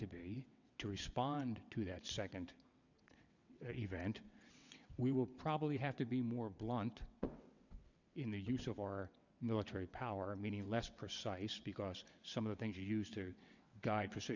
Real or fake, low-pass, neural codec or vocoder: fake; 7.2 kHz; vocoder, 22.05 kHz, 80 mel bands, WaveNeXt